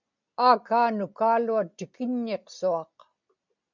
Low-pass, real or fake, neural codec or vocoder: 7.2 kHz; real; none